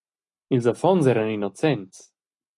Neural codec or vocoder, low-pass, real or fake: none; 10.8 kHz; real